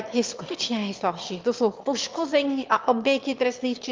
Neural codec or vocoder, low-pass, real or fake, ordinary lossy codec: autoencoder, 22.05 kHz, a latent of 192 numbers a frame, VITS, trained on one speaker; 7.2 kHz; fake; Opus, 24 kbps